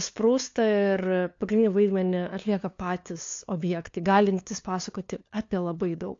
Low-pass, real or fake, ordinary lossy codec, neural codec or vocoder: 7.2 kHz; fake; AAC, 48 kbps; codec, 16 kHz, 2 kbps, FunCodec, trained on LibriTTS, 25 frames a second